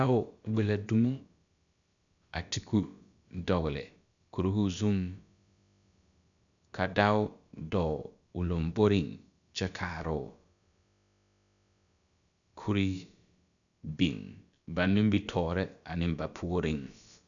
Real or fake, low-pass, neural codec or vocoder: fake; 7.2 kHz; codec, 16 kHz, about 1 kbps, DyCAST, with the encoder's durations